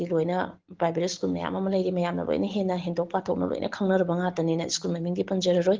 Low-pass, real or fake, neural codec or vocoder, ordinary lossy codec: 7.2 kHz; real; none; Opus, 16 kbps